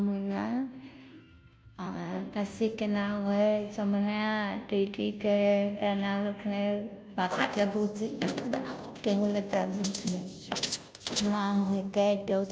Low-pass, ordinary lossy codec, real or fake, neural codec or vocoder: none; none; fake; codec, 16 kHz, 0.5 kbps, FunCodec, trained on Chinese and English, 25 frames a second